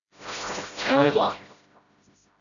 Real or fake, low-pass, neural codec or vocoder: fake; 7.2 kHz; codec, 16 kHz, 0.5 kbps, FreqCodec, smaller model